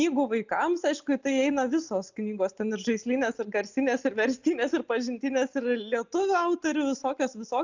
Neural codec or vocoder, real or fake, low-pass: none; real; 7.2 kHz